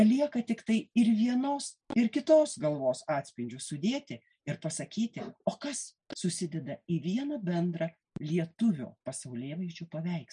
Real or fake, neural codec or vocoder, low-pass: real; none; 9.9 kHz